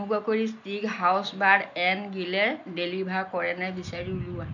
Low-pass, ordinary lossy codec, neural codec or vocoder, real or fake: 7.2 kHz; none; none; real